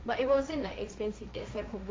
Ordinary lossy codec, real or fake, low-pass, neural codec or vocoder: none; fake; none; codec, 16 kHz, 1.1 kbps, Voila-Tokenizer